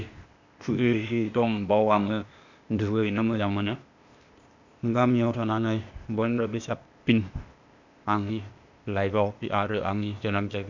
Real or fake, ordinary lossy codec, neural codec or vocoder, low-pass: fake; none; codec, 16 kHz, 0.8 kbps, ZipCodec; 7.2 kHz